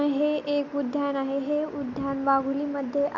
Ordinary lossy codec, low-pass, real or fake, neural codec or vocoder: none; 7.2 kHz; real; none